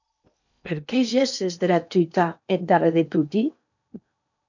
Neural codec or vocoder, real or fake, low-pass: codec, 16 kHz in and 24 kHz out, 0.8 kbps, FocalCodec, streaming, 65536 codes; fake; 7.2 kHz